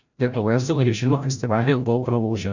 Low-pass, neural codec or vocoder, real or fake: 7.2 kHz; codec, 16 kHz, 0.5 kbps, FreqCodec, larger model; fake